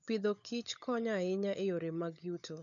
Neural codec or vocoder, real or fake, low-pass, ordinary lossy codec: codec, 16 kHz, 16 kbps, FunCodec, trained on LibriTTS, 50 frames a second; fake; 7.2 kHz; none